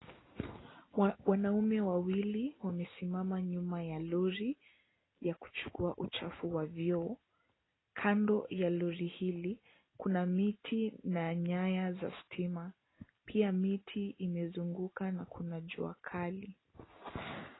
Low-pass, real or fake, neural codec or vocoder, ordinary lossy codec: 7.2 kHz; real; none; AAC, 16 kbps